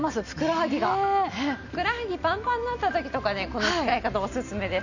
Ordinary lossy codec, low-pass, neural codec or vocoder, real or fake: none; 7.2 kHz; none; real